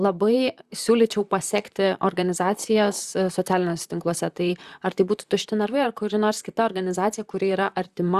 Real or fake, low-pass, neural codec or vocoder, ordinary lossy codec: fake; 14.4 kHz; vocoder, 44.1 kHz, 128 mel bands, Pupu-Vocoder; Opus, 64 kbps